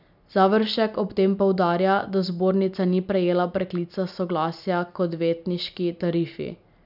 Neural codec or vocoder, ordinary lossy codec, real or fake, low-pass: none; none; real; 5.4 kHz